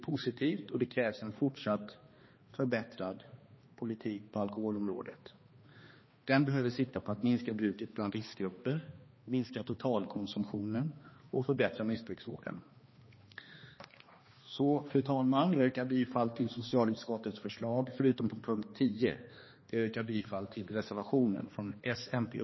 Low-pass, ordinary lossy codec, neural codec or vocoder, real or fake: 7.2 kHz; MP3, 24 kbps; codec, 16 kHz, 2 kbps, X-Codec, HuBERT features, trained on balanced general audio; fake